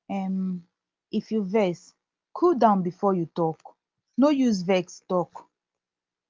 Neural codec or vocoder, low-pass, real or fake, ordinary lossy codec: none; 7.2 kHz; real; Opus, 32 kbps